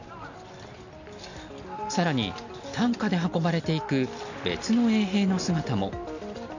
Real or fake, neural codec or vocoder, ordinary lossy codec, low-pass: real; none; none; 7.2 kHz